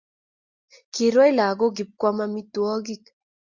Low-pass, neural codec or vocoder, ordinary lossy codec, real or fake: 7.2 kHz; none; Opus, 64 kbps; real